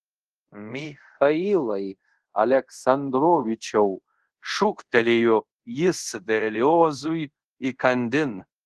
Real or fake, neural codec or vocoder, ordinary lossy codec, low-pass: fake; codec, 24 kHz, 0.5 kbps, DualCodec; Opus, 16 kbps; 10.8 kHz